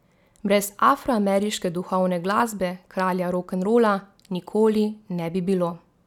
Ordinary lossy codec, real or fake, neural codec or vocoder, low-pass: none; real; none; 19.8 kHz